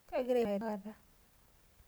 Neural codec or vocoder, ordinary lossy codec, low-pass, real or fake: vocoder, 44.1 kHz, 128 mel bands every 512 samples, BigVGAN v2; none; none; fake